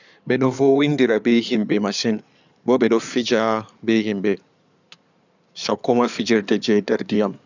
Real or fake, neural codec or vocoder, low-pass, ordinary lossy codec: fake; codec, 16 kHz in and 24 kHz out, 2.2 kbps, FireRedTTS-2 codec; 7.2 kHz; none